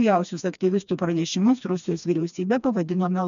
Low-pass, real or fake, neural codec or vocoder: 7.2 kHz; fake; codec, 16 kHz, 2 kbps, FreqCodec, smaller model